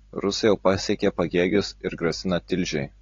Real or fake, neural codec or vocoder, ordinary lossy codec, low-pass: real; none; AAC, 32 kbps; 7.2 kHz